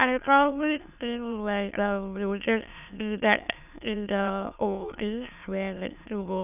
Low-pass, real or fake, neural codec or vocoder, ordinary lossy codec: 3.6 kHz; fake; autoencoder, 22.05 kHz, a latent of 192 numbers a frame, VITS, trained on many speakers; none